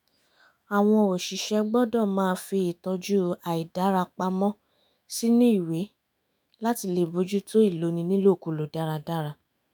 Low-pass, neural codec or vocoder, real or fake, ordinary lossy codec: none; autoencoder, 48 kHz, 128 numbers a frame, DAC-VAE, trained on Japanese speech; fake; none